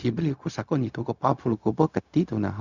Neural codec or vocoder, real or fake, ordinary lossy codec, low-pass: codec, 16 kHz, 0.4 kbps, LongCat-Audio-Codec; fake; none; 7.2 kHz